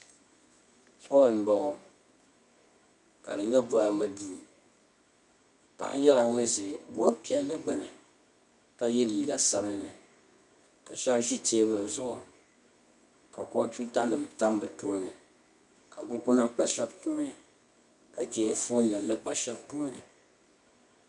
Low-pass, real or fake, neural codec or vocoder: 10.8 kHz; fake; codec, 24 kHz, 0.9 kbps, WavTokenizer, medium music audio release